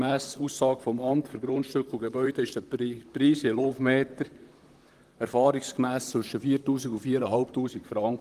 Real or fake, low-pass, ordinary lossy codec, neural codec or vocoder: fake; 14.4 kHz; Opus, 24 kbps; vocoder, 44.1 kHz, 128 mel bands, Pupu-Vocoder